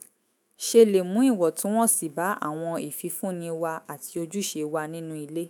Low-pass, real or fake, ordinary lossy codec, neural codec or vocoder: none; fake; none; autoencoder, 48 kHz, 128 numbers a frame, DAC-VAE, trained on Japanese speech